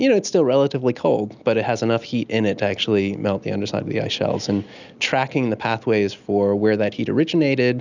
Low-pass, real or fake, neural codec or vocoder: 7.2 kHz; real; none